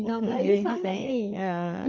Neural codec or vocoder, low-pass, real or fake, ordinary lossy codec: codec, 16 kHz, 4 kbps, FreqCodec, larger model; 7.2 kHz; fake; MP3, 64 kbps